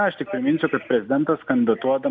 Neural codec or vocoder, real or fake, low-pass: none; real; 7.2 kHz